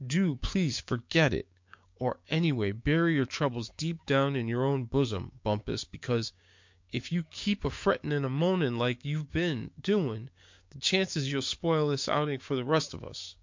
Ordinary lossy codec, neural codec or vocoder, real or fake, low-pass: MP3, 48 kbps; codec, 16 kHz, 6 kbps, DAC; fake; 7.2 kHz